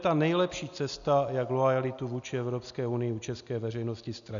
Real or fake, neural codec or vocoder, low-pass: real; none; 7.2 kHz